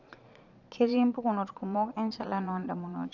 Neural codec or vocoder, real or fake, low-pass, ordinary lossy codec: vocoder, 22.05 kHz, 80 mel bands, Vocos; fake; 7.2 kHz; none